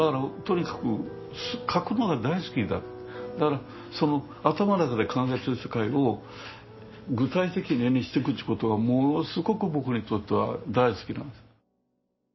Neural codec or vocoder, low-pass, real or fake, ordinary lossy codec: none; 7.2 kHz; real; MP3, 24 kbps